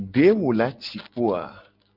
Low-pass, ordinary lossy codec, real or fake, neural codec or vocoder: 5.4 kHz; Opus, 24 kbps; real; none